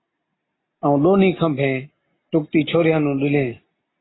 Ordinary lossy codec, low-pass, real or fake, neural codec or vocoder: AAC, 16 kbps; 7.2 kHz; real; none